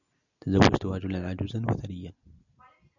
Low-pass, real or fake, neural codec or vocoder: 7.2 kHz; real; none